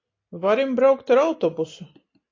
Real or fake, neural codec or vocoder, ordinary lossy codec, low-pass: real; none; AAC, 32 kbps; 7.2 kHz